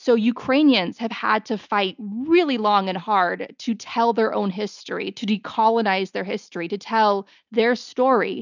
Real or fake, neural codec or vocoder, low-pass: real; none; 7.2 kHz